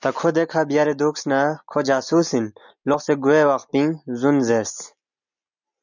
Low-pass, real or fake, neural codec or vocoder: 7.2 kHz; real; none